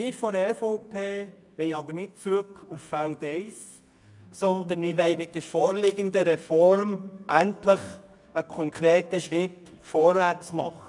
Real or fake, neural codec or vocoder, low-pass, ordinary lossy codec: fake; codec, 24 kHz, 0.9 kbps, WavTokenizer, medium music audio release; 10.8 kHz; none